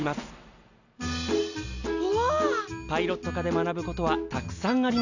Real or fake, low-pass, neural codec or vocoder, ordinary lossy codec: real; 7.2 kHz; none; none